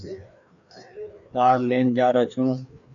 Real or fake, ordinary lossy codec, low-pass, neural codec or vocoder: fake; AAC, 64 kbps; 7.2 kHz; codec, 16 kHz, 2 kbps, FreqCodec, larger model